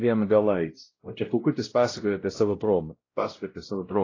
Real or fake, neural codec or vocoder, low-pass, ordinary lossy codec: fake; codec, 16 kHz, 0.5 kbps, X-Codec, WavLM features, trained on Multilingual LibriSpeech; 7.2 kHz; AAC, 32 kbps